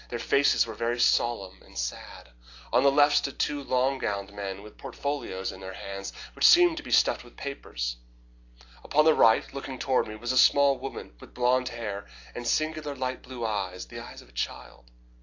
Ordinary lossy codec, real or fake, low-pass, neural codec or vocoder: AAC, 48 kbps; real; 7.2 kHz; none